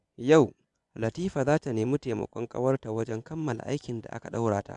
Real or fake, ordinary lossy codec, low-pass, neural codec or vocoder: fake; none; 10.8 kHz; vocoder, 24 kHz, 100 mel bands, Vocos